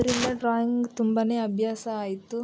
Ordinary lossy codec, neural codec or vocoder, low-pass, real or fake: none; none; none; real